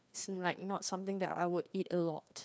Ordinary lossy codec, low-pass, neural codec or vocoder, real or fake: none; none; codec, 16 kHz, 2 kbps, FreqCodec, larger model; fake